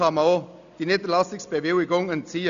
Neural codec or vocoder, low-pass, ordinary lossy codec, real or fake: none; 7.2 kHz; none; real